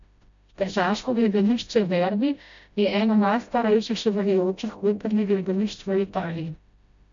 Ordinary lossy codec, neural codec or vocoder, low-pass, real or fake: MP3, 64 kbps; codec, 16 kHz, 0.5 kbps, FreqCodec, smaller model; 7.2 kHz; fake